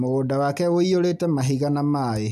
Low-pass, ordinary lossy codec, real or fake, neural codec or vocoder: 14.4 kHz; none; real; none